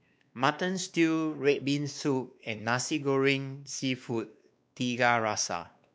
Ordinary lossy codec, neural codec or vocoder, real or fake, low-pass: none; codec, 16 kHz, 2 kbps, X-Codec, WavLM features, trained on Multilingual LibriSpeech; fake; none